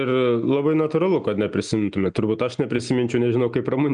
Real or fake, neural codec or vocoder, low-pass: real; none; 9.9 kHz